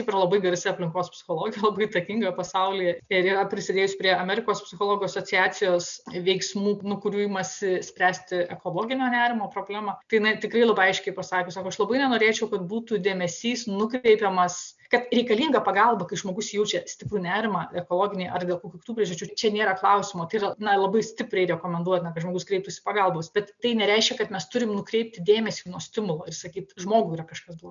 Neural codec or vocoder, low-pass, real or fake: none; 7.2 kHz; real